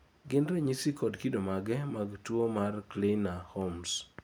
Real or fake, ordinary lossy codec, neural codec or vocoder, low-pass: real; none; none; none